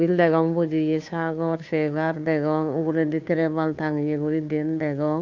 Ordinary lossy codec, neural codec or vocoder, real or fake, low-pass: MP3, 64 kbps; codec, 16 kHz, 2 kbps, FunCodec, trained on Chinese and English, 25 frames a second; fake; 7.2 kHz